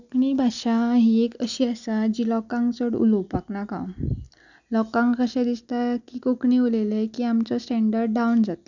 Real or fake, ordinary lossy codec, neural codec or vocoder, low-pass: real; none; none; 7.2 kHz